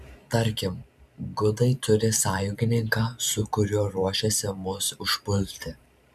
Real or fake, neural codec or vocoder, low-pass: fake; vocoder, 44.1 kHz, 128 mel bands every 512 samples, BigVGAN v2; 14.4 kHz